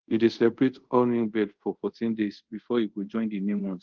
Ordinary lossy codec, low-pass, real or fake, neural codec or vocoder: Opus, 16 kbps; 7.2 kHz; fake; codec, 24 kHz, 0.5 kbps, DualCodec